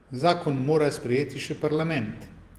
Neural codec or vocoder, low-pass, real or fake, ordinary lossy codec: vocoder, 48 kHz, 128 mel bands, Vocos; 14.4 kHz; fake; Opus, 24 kbps